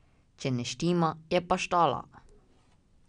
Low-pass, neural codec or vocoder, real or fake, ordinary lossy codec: 9.9 kHz; none; real; none